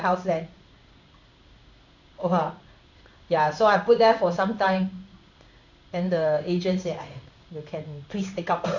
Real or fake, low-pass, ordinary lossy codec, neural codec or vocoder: fake; 7.2 kHz; none; codec, 16 kHz in and 24 kHz out, 1 kbps, XY-Tokenizer